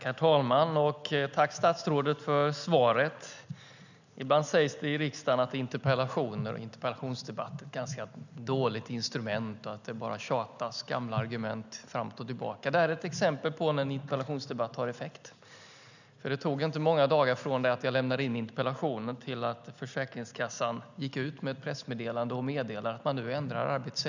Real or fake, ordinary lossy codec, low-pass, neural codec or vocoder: real; none; 7.2 kHz; none